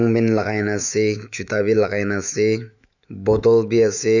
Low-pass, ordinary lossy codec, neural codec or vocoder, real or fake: 7.2 kHz; none; autoencoder, 48 kHz, 128 numbers a frame, DAC-VAE, trained on Japanese speech; fake